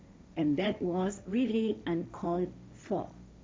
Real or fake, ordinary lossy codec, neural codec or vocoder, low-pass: fake; none; codec, 16 kHz, 1.1 kbps, Voila-Tokenizer; none